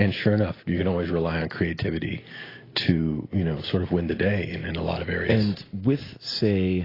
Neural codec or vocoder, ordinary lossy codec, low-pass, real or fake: none; AAC, 24 kbps; 5.4 kHz; real